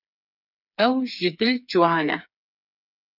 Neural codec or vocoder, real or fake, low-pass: codec, 16 kHz, 4 kbps, FreqCodec, smaller model; fake; 5.4 kHz